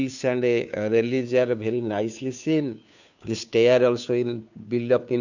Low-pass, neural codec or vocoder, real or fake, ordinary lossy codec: 7.2 kHz; codec, 16 kHz, 2 kbps, FunCodec, trained on Chinese and English, 25 frames a second; fake; none